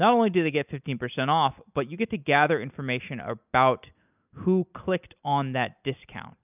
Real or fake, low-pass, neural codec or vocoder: real; 3.6 kHz; none